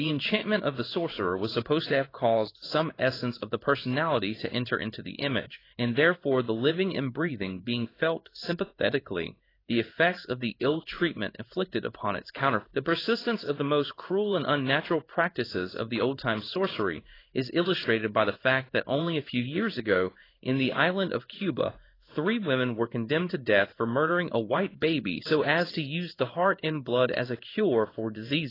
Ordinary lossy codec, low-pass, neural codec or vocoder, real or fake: AAC, 24 kbps; 5.4 kHz; codec, 16 kHz in and 24 kHz out, 1 kbps, XY-Tokenizer; fake